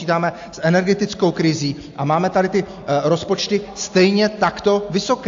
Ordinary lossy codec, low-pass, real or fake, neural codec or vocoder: AAC, 64 kbps; 7.2 kHz; real; none